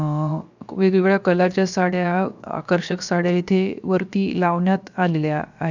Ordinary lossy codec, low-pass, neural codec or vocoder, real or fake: none; 7.2 kHz; codec, 16 kHz, 0.7 kbps, FocalCodec; fake